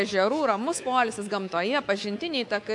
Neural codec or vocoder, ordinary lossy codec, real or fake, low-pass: codec, 24 kHz, 3.1 kbps, DualCodec; AAC, 64 kbps; fake; 10.8 kHz